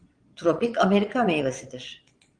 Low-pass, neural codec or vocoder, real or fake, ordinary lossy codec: 9.9 kHz; vocoder, 22.05 kHz, 80 mel bands, Vocos; fake; Opus, 24 kbps